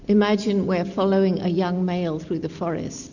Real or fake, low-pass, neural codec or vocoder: real; 7.2 kHz; none